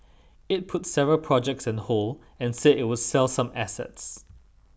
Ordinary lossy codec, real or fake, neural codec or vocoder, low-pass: none; real; none; none